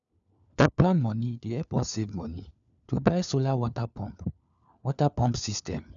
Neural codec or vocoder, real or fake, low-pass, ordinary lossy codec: codec, 16 kHz, 4 kbps, FunCodec, trained on LibriTTS, 50 frames a second; fake; 7.2 kHz; none